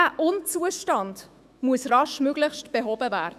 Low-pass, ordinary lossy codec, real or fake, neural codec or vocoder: 14.4 kHz; none; fake; autoencoder, 48 kHz, 128 numbers a frame, DAC-VAE, trained on Japanese speech